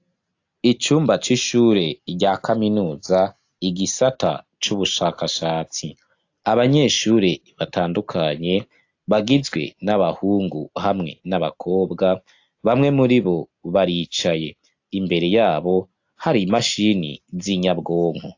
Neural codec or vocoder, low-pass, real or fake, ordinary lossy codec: none; 7.2 kHz; real; AAC, 48 kbps